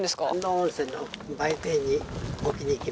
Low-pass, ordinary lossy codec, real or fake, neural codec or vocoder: none; none; real; none